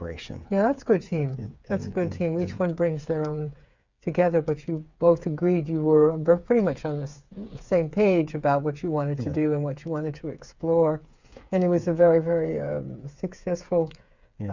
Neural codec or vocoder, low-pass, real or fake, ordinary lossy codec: codec, 16 kHz, 8 kbps, FreqCodec, smaller model; 7.2 kHz; fake; Opus, 64 kbps